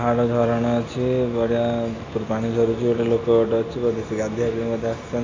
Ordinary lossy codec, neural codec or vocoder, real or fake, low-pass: AAC, 32 kbps; none; real; 7.2 kHz